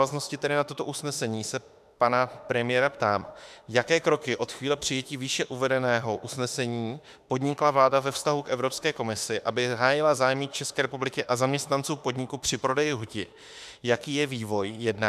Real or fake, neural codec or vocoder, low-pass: fake; autoencoder, 48 kHz, 32 numbers a frame, DAC-VAE, trained on Japanese speech; 14.4 kHz